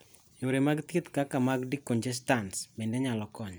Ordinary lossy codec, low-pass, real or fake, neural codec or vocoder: none; none; real; none